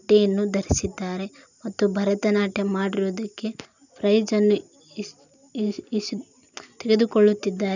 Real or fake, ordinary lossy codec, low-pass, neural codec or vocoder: real; none; 7.2 kHz; none